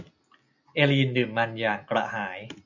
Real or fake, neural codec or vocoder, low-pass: real; none; 7.2 kHz